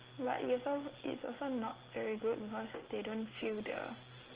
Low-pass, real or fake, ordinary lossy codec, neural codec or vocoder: 3.6 kHz; real; Opus, 16 kbps; none